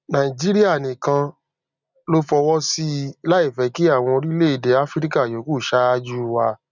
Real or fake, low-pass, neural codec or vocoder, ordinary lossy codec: real; 7.2 kHz; none; none